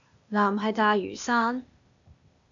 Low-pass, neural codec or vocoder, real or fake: 7.2 kHz; codec, 16 kHz, 0.8 kbps, ZipCodec; fake